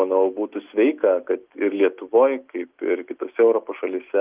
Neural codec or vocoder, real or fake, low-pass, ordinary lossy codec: none; real; 3.6 kHz; Opus, 32 kbps